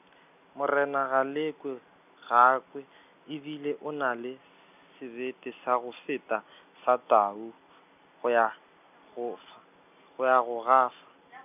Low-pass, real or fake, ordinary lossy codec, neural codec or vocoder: 3.6 kHz; real; none; none